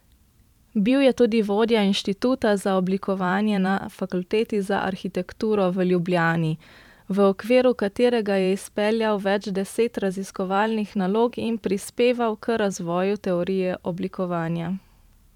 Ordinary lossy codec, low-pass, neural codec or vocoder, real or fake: none; 19.8 kHz; vocoder, 44.1 kHz, 128 mel bands every 512 samples, BigVGAN v2; fake